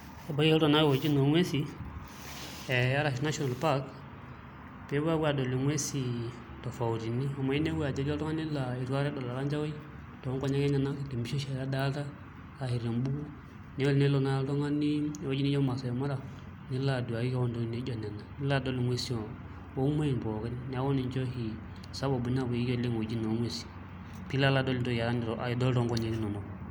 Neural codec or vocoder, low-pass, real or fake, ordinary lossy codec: none; none; real; none